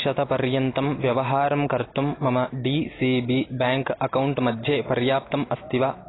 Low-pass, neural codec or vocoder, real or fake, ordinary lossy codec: 7.2 kHz; none; real; AAC, 16 kbps